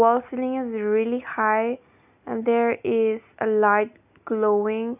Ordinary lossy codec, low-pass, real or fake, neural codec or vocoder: none; 3.6 kHz; real; none